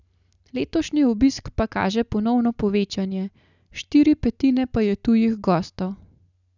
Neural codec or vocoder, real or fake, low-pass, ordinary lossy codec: none; real; 7.2 kHz; none